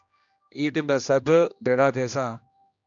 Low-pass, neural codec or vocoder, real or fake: 7.2 kHz; codec, 16 kHz, 1 kbps, X-Codec, HuBERT features, trained on general audio; fake